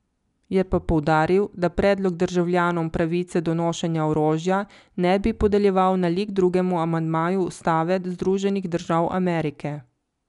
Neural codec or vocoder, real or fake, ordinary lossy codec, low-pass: none; real; none; 10.8 kHz